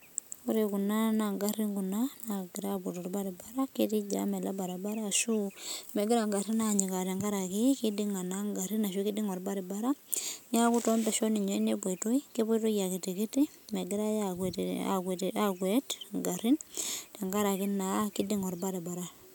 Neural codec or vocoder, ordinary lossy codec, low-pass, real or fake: none; none; none; real